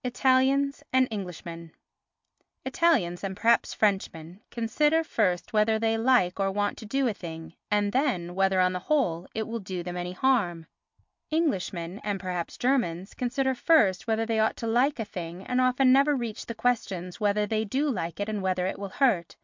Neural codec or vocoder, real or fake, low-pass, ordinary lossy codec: none; real; 7.2 kHz; MP3, 64 kbps